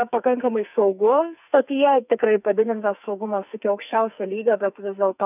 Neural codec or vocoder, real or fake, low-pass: codec, 44.1 kHz, 2.6 kbps, SNAC; fake; 3.6 kHz